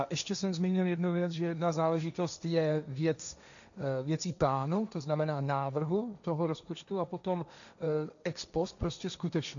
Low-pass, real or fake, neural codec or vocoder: 7.2 kHz; fake; codec, 16 kHz, 1.1 kbps, Voila-Tokenizer